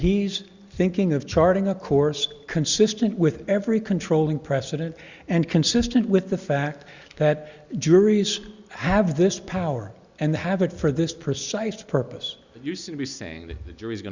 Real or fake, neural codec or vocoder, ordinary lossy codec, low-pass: real; none; Opus, 64 kbps; 7.2 kHz